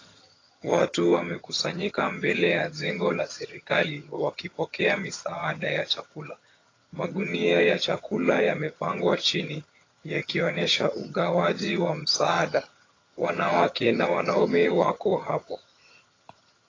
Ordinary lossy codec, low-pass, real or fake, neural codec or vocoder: AAC, 32 kbps; 7.2 kHz; fake; vocoder, 22.05 kHz, 80 mel bands, HiFi-GAN